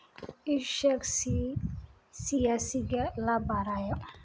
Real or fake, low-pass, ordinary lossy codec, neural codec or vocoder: real; none; none; none